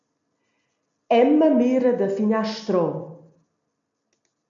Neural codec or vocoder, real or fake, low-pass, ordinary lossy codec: none; real; 7.2 kHz; MP3, 64 kbps